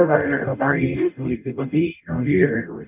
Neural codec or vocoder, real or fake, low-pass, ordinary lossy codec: codec, 44.1 kHz, 0.9 kbps, DAC; fake; 3.6 kHz; none